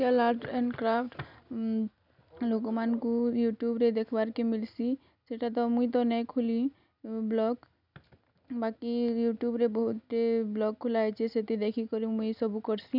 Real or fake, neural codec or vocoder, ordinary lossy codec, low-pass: real; none; none; 5.4 kHz